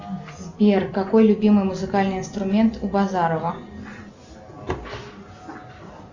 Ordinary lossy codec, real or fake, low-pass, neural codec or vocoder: Opus, 64 kbps; real; 7.2 kHz; none